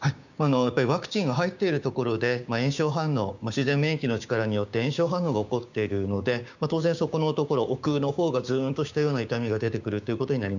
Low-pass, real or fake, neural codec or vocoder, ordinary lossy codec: 7.2 kHz; fake; codec, 16 kHz, 6 kbps, DAC; none